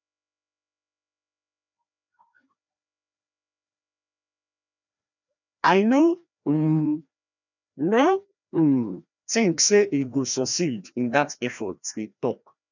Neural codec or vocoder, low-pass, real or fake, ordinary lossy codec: codec, 16 kHz, 1 kbps, FreqCodec, larger model; 7.2 kHz; fake; none